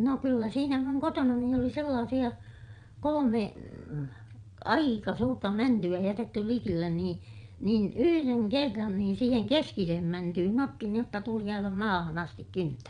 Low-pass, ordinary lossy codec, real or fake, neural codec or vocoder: 9.9 kHz; none; fake; vocoder, 22.05 kHz, 80 mel bands, Vocos